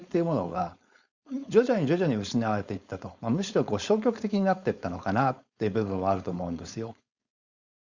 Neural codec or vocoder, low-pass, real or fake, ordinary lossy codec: codec, 16 kHz, 4.8 kbps, FACodec; 7.2 kHz; fake; Opus, 64 kbps